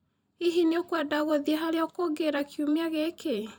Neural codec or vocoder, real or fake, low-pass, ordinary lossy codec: vocoder, 44.1 kHz, 128 mel bands every 512 samples, BigVGAN v2; fake; none; none